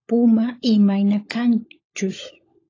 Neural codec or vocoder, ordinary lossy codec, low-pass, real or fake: codec, 16 kHz, 16 kbps, FunCodec, trained on LibriTTS, 50 frames a second; AAC, 32 kbps; 7.2 kHz; fake